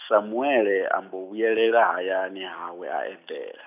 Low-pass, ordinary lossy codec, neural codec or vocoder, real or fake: 3.6 kHz; none; none; real